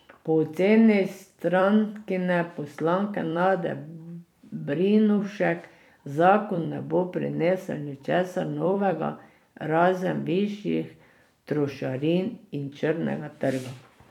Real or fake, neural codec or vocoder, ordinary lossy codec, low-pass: fake; vocoder, 44.1 kHz, 128 mel bands every 256 samples, BigVGAN v2; none; 19.8 kHz